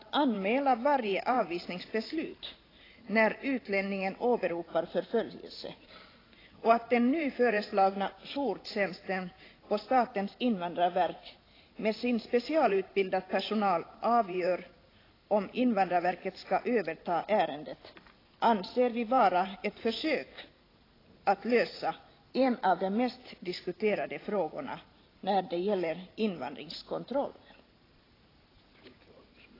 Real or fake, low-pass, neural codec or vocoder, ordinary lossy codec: fake; 5.4 kHz; vocoder, 44.1 kHz, 128 mel bands every 512 samples, BigVGAN v2; AAC, 24 kbps